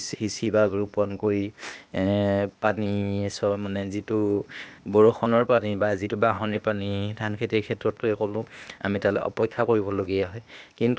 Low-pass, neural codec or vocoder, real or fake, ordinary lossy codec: none; codec, 16 kHz, 0.8 kbps, ZipCodec; fake; none